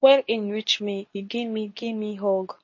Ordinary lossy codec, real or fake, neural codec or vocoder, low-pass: MP3, 32 kbps; fake; codec, 24 kHz, 0.9 kbps, WavTokenizer, medium speech release version 2; 7.2 kHz